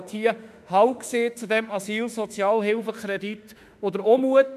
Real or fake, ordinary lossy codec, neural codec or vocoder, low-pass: fake; none; autoencoder, 48 kHz, 32 numbers a frame, DAC-VAE, trained on Japanese speech; 14.4 kHz